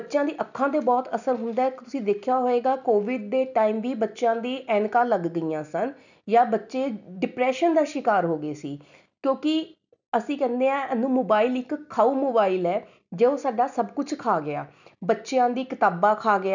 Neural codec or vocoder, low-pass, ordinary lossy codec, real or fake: none; 7.2 kHz; none; real